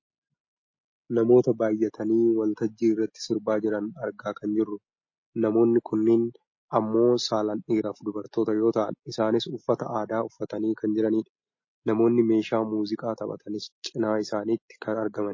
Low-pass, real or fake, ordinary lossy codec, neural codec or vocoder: 7.2 kHz; real; MP3, 32 kbps; none